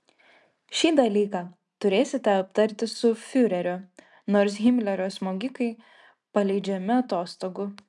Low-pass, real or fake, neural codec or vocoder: 10.8 kHz; real; none